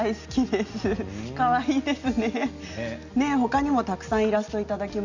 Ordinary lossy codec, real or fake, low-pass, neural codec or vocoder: Opus, 64 kbps; real; 7.2 kHz; none